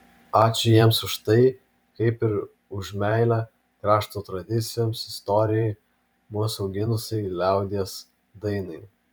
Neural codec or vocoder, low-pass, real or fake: vocoder, 44.1 kHz, 128 mel bands every 512 samples, BigVGAN v2; 19.8 kHz; fake